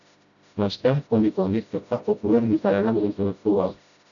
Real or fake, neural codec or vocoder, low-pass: fake; codec, 16 kHz, 0.5 kbps, FreqCodec, smaller model; 7.2 kHz